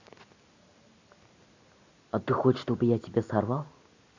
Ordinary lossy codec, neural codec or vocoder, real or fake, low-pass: AAC, 48 kbps; none; real; 7.2 kHz